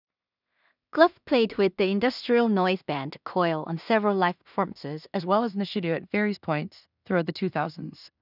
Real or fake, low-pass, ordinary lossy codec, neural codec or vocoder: fake; 5.4 kHz; none; codec, 16 kHz in and 24 kHz out, 0.4 kbps, LongCat-Audio-Codec, two codebook decoder